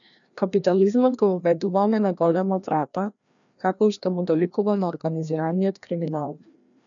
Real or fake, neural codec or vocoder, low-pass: fake; codec, 16 kHz, 1 kbps, FreqCodec, larger model; 7.2 kHz